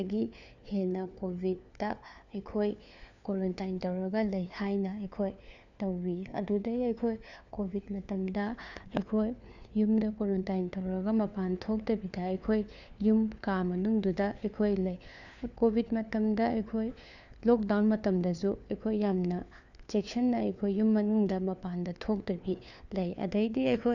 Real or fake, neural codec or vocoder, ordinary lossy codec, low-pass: fake; codec, 16 kHz, 4 kbps, FunCodec, trained on LibriTTS, 50 frames a second; none; 7.2 kHz